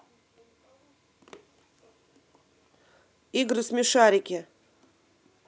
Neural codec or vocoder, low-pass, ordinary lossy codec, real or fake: none; none; none; real